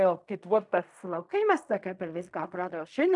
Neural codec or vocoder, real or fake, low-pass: codec, 16 kHz in and 24 kHz out, 0.4 kbps, LongCat-Audio-Codec, fine tuned four codebook decoder; fake; 10.8 kHz